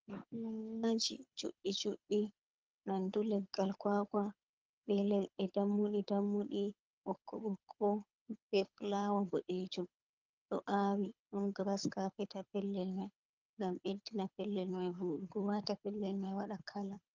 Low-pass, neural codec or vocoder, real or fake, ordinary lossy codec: 7.2 kHz; codec, 24 kHz, 6 kbps, HILCodec; fake; Opus, 24 kbps